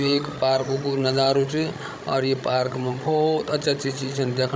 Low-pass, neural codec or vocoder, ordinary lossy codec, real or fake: none; codec, 16 kHz, 16 kbps, FreqCodec, larger model; none; fake